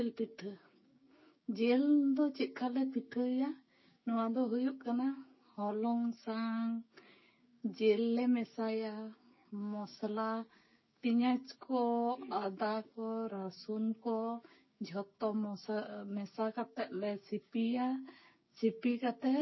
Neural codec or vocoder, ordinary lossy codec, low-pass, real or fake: codec, 16 kHz, 6 kbps, DAC; MP3, 24 kbps; 7.2 kHz; fake